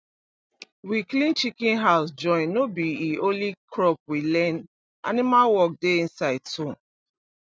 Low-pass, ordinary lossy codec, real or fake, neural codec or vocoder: none; none; real; none